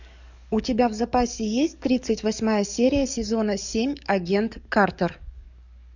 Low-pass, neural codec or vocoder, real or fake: 7.2 kHz; codec, 44.1 kHz, 7.8 kbps, DAC; fake